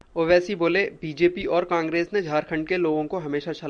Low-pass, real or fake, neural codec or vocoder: 9.9 kHz; real; none